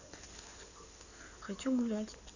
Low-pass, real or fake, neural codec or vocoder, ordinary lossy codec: 7.2 kHz; fake; codec, 16 kHz in and 24 kHz out, 2.2 kbps, FireRedTTS-2 codec; none